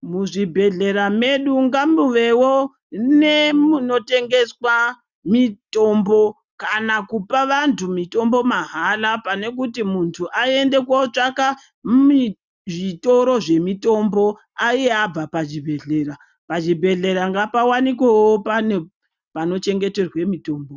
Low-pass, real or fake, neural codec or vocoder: 7.2 kHz; real; none